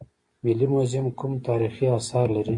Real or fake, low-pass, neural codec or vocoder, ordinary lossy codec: real; 10.8 kHz; none; AAC, 64 kbps